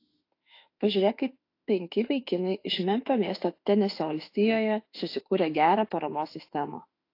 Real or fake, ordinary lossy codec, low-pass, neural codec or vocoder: fake; AAC, 32 kbps; 5.4 kHz; autoencoder, 48 kHz, 32 numbers a frame, DAC-VAE, trained on Japanese speech